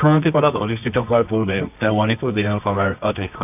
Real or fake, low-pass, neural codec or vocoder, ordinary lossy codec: fake; 3.6 kHz; codec, 24 kHz, 0.9 kbps, WavTokenizer, medium music audio release; none